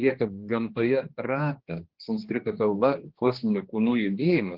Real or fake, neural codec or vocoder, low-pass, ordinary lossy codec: fake; codec, 16 kHz, 2 kbps, X-Codec, HuBERT features, trained on general audio; 5.4 kHz; Opus, 16 kbps